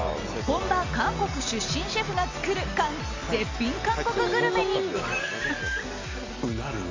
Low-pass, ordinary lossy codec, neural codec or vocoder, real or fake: 7.2 kHz; MP3, 64 kbps; none; real